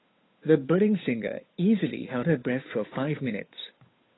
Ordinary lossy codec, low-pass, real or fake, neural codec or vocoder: AAC, 16 kbps; 7.2 kHz; fake; codec, 16 kHz, 8 kbps, FunCodec, trained on Chinese and English, 25 frames a second